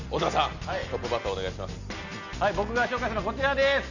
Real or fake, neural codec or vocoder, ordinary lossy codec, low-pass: real; none; none; 7.2 kHz